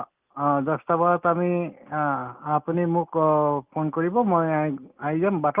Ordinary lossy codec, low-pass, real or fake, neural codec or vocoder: Opus, 24 kbps; 3.6 kHz; real; none